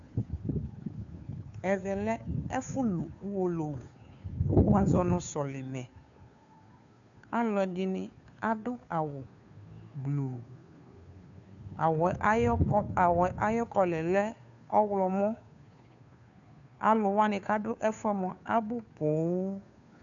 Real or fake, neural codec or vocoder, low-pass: fake; codec, 16 kHz, 2 kbps, FunCodec, trained on Chinese and English, 25 frames a second; 7.2 kHz